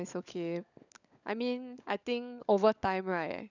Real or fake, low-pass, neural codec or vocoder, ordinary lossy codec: fake; 7.2 kHz; codec, 16 kHz, 16 kbps, FunCodec, trained on LibriTTS, 50 frames a second; none